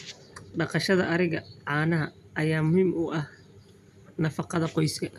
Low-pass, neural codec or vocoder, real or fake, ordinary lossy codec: 14.4 kHz; none; real; none